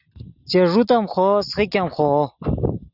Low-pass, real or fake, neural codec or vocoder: 5.4 kHz; real; none